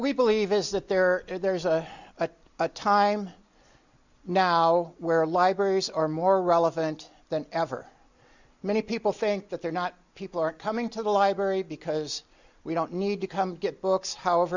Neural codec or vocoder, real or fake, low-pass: none; real; 7.2 kHz